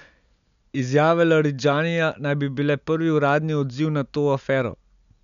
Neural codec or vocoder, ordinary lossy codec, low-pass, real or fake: none; none; 7.2 kHz; real